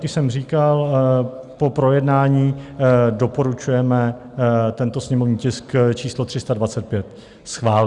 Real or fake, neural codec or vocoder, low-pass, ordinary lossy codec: real; none; 10.8 kHz; Opus, 64 kbps